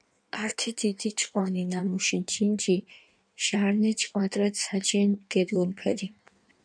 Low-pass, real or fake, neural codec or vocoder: 9.9 kHz; fake; codec, 16 kHz in and 24 kHz out, 1.1 kbps, FireRedTTS-2 codec